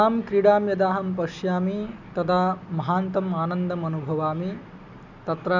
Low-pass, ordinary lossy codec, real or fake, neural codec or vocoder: 7.2 kHz; none; real; none